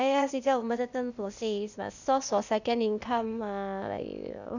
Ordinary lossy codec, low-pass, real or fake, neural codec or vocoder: none; 7.2 kHz; fake; codec, 16 kHz, 0.8 kbps, ZipCodec